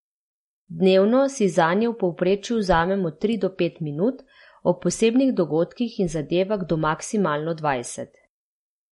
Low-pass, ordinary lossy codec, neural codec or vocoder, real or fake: 19.8 kHz; MP3, 48 kbps; none; real